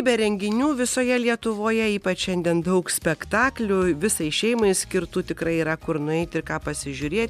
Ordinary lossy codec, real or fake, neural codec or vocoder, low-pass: MP3, 96 kbps; real; none; 14.4 kHz